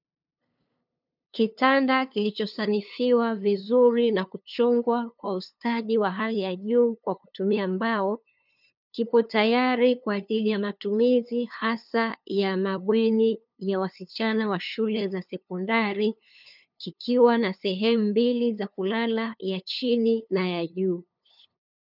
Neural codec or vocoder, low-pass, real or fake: codec, 16 kHz, 2 kbps, FunCodec, trained on LibriTTS, 25 frames a second; 5.4 kHz; fake